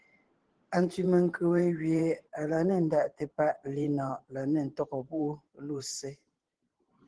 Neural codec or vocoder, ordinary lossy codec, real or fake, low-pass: vocoder, 44.1 kHz, 128 mel bands every 512 samples, BigVGAN v2; Opus, 16 kbps; fake; 9.9 kHz